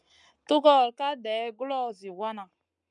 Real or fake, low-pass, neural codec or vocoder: fake; 10.8 kHz; codec, 44.1 kHz, 7.8 kbps, Pupu-Codec